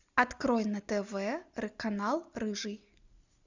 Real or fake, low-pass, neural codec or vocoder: real; 7.2 kHz; none